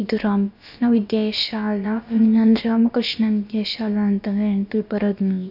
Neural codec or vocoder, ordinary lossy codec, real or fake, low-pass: codec, 16 kHz, about 1 kbps, DyCAST, with the encoder's durations; none; fake; 5.4 kHz